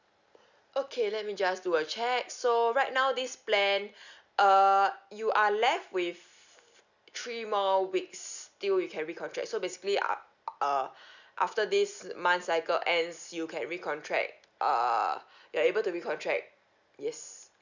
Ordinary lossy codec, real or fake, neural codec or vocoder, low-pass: none; real; none; 7.2 kHz